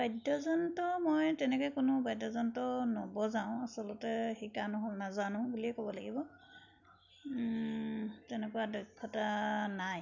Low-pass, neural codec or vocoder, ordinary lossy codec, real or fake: 7.2 kHz; none; none; real